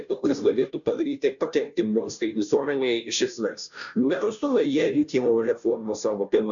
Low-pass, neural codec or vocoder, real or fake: 7.2 kHz; codec, 16 kHz, 0.5 kbps, FunCodec, trained on Chinese and English, 25 frames a second; fake